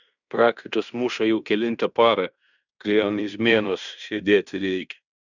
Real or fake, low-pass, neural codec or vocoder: fake; 7.2 kHz; codec, 16 kHz in and 24 kHz out, 0.9 kbps, LongCat-Audio-Codec, fine tuned four codebook decoder